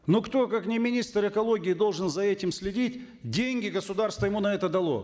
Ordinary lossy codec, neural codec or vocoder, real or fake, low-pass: none; none; real; none